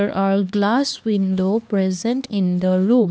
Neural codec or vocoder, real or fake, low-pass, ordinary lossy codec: codec, 16 kHz, 2 kbps, X-Codec, HuBERT features, trained on LibriSpeech; fake; none; none